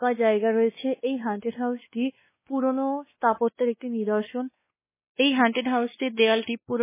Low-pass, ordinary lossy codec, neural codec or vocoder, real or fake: 3.6 kHz; MP3, 16 kbps; codec, 16 kHz, 2 kbps, X-Codec, WavLM features, trained on Multilingual LibriSpeech; fake